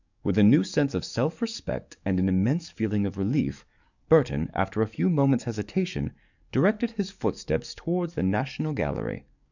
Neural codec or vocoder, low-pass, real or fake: codec, 44.1 kHz, 7.8 kbps, DAC; 7.2 kHz; fake